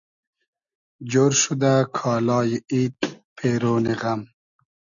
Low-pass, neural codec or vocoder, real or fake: 7.2 kHz; none; real